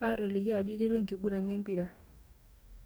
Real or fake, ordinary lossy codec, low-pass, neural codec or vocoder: fake; none; none; codec, 44.1 kHz, 2.6 kbps, DAC